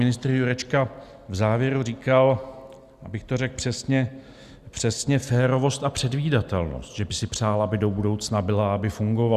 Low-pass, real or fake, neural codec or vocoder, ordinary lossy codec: 14.4 kHz; real; none; Opus, 64 kbps